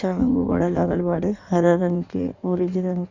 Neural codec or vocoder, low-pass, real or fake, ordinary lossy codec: codec, 16 kHz in and 24 kHz out, 1.1 kbps, FireRedTTS-2 codec; 7.2 kHz; fake; Opus, 64 kbps